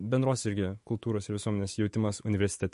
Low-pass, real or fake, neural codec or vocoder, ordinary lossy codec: 14.4 kHz; fake; autoencoder, 48 kHz, 128 numbers a frame, DAC-VAE, trained on Japanese speech; MP3, 48 kbps